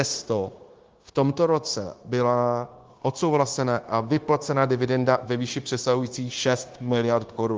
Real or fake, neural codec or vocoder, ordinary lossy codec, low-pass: fake; codec, 16 kHz, 0.9 kbps, LongCat-Audio-Codec; Opus, 16 kbps; 7.2 kHz